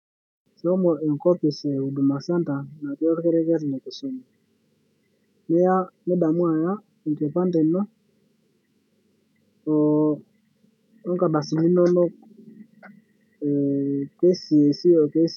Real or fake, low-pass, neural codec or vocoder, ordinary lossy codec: fake; 19.8 kHz; autoencoder, 48 kHz, 128 numbers a frame, DAC-VAE, trained on Japanese speech; none